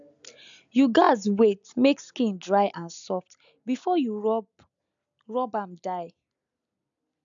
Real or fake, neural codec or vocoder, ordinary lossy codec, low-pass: real; none; none; 7.2 kHz